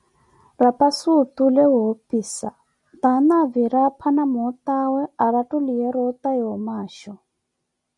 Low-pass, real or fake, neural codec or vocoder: 10.8 kHz; real; none